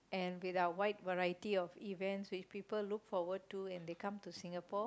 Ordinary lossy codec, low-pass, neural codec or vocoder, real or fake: none; none; none; real